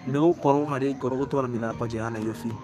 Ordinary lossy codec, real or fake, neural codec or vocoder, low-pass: none; fake; codec, 32 kHz, 1.9 kbps, SNAC; 14.4 kHz